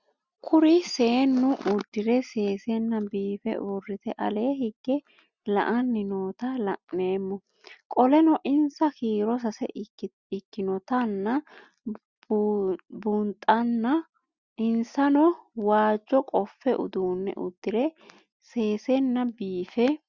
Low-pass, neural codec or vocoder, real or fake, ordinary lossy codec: 7.2 kHz; none; real; Opus, 64 kbps